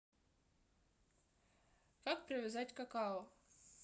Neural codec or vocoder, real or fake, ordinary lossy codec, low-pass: none; real; none; none